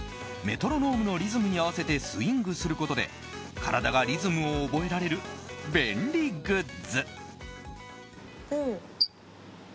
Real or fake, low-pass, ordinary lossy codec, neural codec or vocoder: real; none; none; none